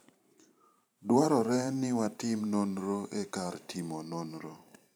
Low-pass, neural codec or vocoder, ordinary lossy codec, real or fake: none; vocoder, 44.1 kHz, 128 mel bands every 512 samples, BigVGAN v2; none; fake